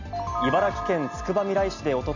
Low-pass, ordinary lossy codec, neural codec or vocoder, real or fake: 7.2 kHz; none; none; real